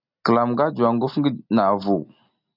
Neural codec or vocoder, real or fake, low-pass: none; real; 5.4 kHz